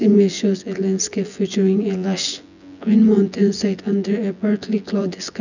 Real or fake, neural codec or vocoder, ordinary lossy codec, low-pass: fake; vocoder, 24 kHz, 100 mel bands, Vocos; none; 7.2 kHz